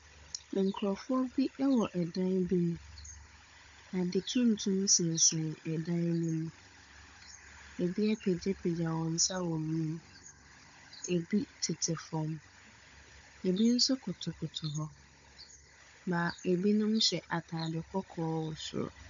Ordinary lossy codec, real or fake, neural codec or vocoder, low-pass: AAC, 64 kbps; fake; codec, 16 kHz, 16 kbps, FunCodec, trained on Chinese and English, 50 frames a second; 7.2 kHz